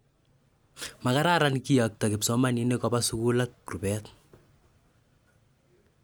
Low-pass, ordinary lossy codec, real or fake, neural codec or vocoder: none; none; real; none